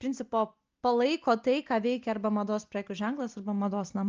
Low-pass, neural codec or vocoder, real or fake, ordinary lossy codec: 7.2 kHz; none; real; Opus, 24 kbps